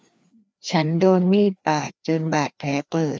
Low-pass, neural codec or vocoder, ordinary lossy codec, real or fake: none; codec, 16 kHz, 2 kbps, FreqCodec, larger model; none; fake